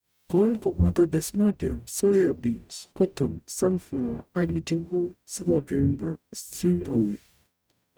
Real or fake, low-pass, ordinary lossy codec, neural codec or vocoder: fake; none; none; codec, 44.1 kHz, 0.9 kbps, DAC